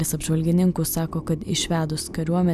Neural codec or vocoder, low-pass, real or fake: none; 14.4 kHz; real